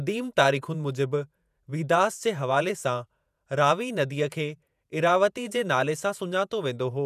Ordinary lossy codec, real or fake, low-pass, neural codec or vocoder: none; fake; 14.4 kHz; vocoder, 48 kHz, 128 mel bands, Vocos